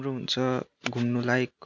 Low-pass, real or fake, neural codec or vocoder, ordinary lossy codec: 7.2 kHz; real; none; none